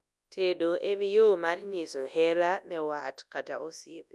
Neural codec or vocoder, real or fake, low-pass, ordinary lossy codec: codec, 24 kHz, 0.9 kbps, WavTokenizer, large speech release; fake; none; none